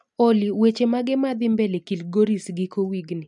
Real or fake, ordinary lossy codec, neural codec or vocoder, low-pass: real; none; none; 10.8 kHz